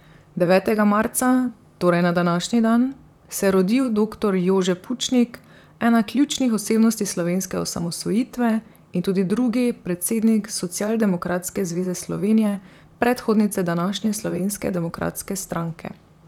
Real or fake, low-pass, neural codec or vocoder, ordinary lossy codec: fake; 19.8 kHz; vocoder, 44.1 kHz, 128 mel bands, Pupu-Vocoder; none